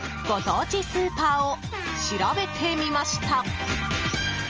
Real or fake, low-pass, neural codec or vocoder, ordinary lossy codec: real; 7.2 kHz; none; Opus, 24 kbps